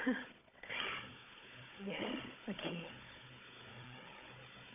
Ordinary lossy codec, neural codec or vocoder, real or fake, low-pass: AAC, 32 kbps; codec, 16 kHz, 16 kbps, FunCodec, trained on Chinese and English, 50 frames a second; fake; 3.6 kHz